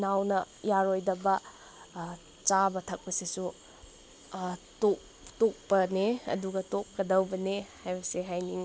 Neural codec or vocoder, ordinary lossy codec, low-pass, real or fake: none; none; none; real